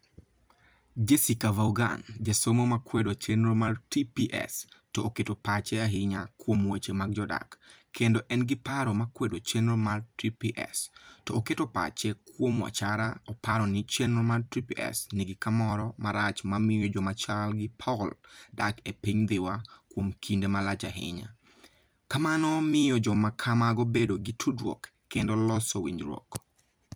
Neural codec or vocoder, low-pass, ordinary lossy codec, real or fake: vocoder, 44.1 kHz, 128 mel bands every 256 samples, BigVGAN v2; none; none; fake